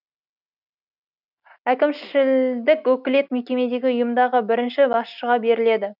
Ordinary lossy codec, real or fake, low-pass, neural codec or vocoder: none; real; 5.4 kHz; none